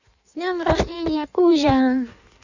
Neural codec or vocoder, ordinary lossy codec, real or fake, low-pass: codec, 16 kHz in and 24 kHz out, 1.1 kbps, FireRedTTS-2 codec; none; fake; 7.2 kHz